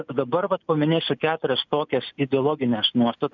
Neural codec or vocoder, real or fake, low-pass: none; real; 7.2 kHz